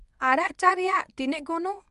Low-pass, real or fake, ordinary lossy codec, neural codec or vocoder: 10.8 kHz; fake; Opus, 64 kbps; codec, 24 kHz, 0.9 kbps, WavTokenizer, medium speech release version 1